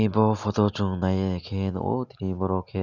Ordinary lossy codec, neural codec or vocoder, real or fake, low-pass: none; none; real; 7.2 kHz